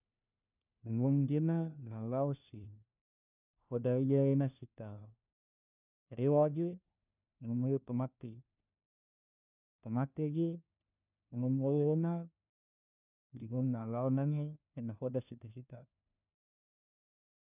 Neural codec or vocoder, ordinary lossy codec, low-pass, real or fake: codec, 16 kHz, 1 kbps, FunCodec, trained on LibriTTS, 50 frames a second; none; 3.6 kHz; fake